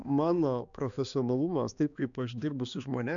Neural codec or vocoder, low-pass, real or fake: codec, 16 kHz, 2 kbps, X-Codec, HuBERT features, trained on balanced general audio; 7.2 kHz; fake